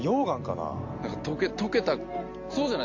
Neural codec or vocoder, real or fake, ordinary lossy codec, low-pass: none; real; MP3, 64 kbps; 7.2 kHz